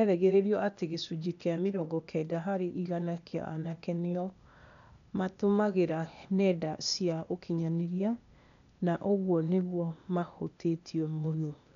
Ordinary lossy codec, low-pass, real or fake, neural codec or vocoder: none; 7.2 kHz; fake; codec, 16 kHz, 0.8 kbps, ZipCodec